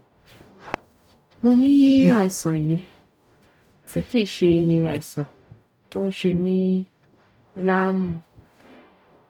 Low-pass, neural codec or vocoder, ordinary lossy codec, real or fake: 19.8 kHz; codec, 44.1 kHz, 0.9 kbps, DAC; none; fake